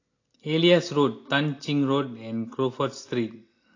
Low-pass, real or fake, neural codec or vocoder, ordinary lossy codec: 7.2 kHz; real; none; AAC, 32 kbps